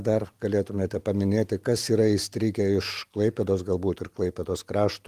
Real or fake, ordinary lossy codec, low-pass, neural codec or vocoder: real; Opus, 24 kbps; 14.4 kHz; none